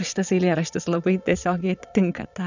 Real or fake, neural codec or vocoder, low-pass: fake; vocoder, 22.05 kHz, 80 mel bands, Vocos; 7.2 kHz